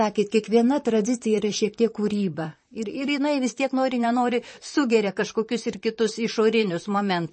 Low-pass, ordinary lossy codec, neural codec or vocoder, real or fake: 10.8 kHz; MP3, 32 kbps; vocoder, 44.1 kHz, 128 mel bands, Pupu-Vocoder; fake